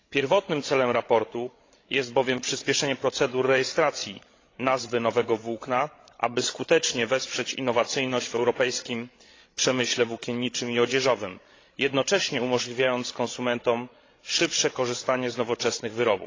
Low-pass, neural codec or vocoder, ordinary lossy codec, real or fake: 7.2 kHz; codec, 16 kHz, 16 kbps, FreqCodec, larger model; AAC, 32 kbps; fake